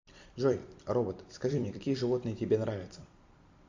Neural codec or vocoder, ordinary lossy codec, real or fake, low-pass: vocoder, 44.1 kHz, 128 mel bands every 256 samples, BigVGAN v2; AAC, 48 kbps; fake; 7.2 kHz